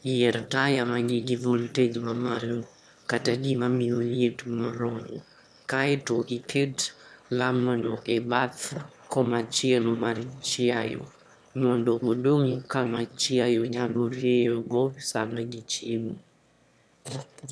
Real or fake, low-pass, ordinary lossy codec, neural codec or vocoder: fake; none; none; autoencoder, 22.05 kHz, a latent of 192 numbers a frame, VITS, trained on one speaker